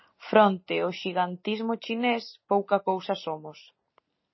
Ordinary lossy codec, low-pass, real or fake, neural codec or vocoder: MP3, 24 kbps; 7.2 kHz; real; none